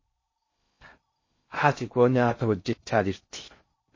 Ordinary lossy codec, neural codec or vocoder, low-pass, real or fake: MP3, 32 kbps; codec, 16 kHz in and 24 kHz out, 0.6 kbps, FocalCodec, streaming, 4096 codes; 7.2 kHz; fake